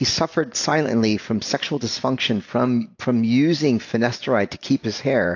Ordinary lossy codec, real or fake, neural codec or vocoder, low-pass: AAC, 48 kbps; real; none; 7.2 kHz